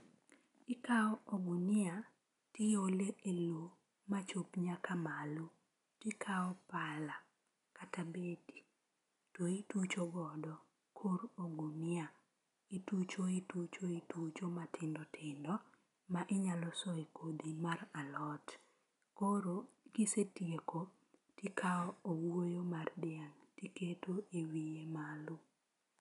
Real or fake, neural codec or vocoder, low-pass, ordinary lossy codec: real; none; 10.8 kHz; none